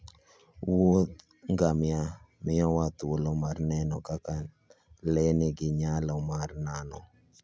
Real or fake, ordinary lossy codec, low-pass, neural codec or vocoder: real; none; none; none